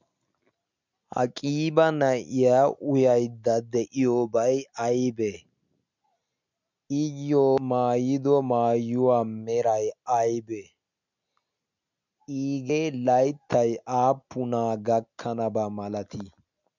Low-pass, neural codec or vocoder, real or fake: 7.2 kHz; none; real